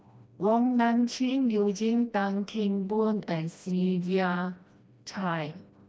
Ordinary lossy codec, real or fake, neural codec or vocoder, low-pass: none; fake; codec, 16 kHz, 1 kbps, FreqCodec, smaller model; none